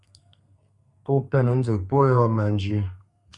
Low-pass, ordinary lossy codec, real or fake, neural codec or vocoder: 10.8 kHz; Opus, 64 kbps; fake; codec, 32 kHz, 1.9 kbps, SNAC